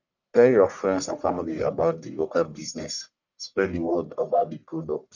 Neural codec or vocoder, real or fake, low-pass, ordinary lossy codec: codec, 44.1 kHz, 1.7 kbps, Pupu-Codec; fake; 7.2 kHz; none